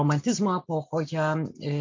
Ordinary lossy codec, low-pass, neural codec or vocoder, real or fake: MP3, 64 kbps; 7.2 kHz; none; real